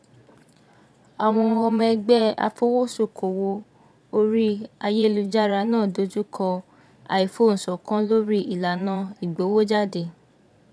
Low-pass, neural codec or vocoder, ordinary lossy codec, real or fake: none; vocoder, 22.05 kHz, 80 mel bands, Vocos; none; fake